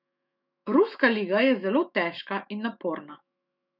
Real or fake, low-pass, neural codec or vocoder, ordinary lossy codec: fake; 5.4 kHz; autoencoder, 48 kHz, 128 numbers a frame, DAC-VAE, trained on Japanese speech; none